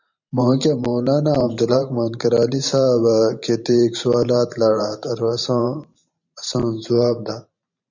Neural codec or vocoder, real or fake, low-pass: vocoder, 44.1 kHz, 128 mel bands every 512 samples, BigVGAN v2; fake; 7.2 kHz